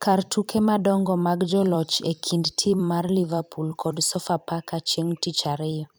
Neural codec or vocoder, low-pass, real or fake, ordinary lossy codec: vocoder, 44.1 kHz, 128 mel bands every 256 samples, BigVGAN v2; none; fake; none